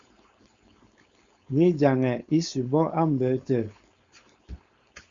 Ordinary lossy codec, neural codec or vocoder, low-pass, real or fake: Opus, 64 kbps; codec, 16 kHz, 4.8 kbps, FACodec; 7.2 kHz; fake